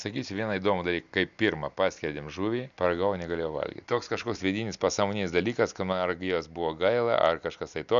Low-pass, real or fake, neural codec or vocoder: 7.2 kHz; real; none